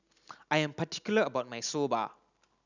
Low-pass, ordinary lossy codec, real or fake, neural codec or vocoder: 7.2 kHz; none; real; none